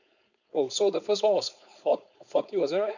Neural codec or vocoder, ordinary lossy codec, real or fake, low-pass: codec, 16 kHz, 4.8 kbps, FACodec; none; fake; 7.2 kHz